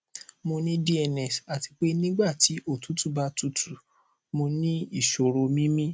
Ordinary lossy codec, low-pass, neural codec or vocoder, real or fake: none; none; none; real